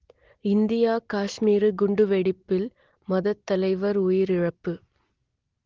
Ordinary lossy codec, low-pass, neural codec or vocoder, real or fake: Opus, 16 kbps; 7.2 kHz; none; real